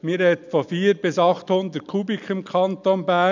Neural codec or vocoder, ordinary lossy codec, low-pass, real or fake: none; none; 7.2 kHz; real